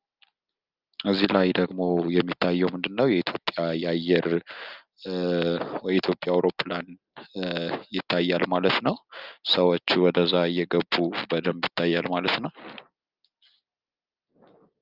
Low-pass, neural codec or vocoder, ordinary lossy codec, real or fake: 5.4 kHz; none; Opus, 24 kbps; real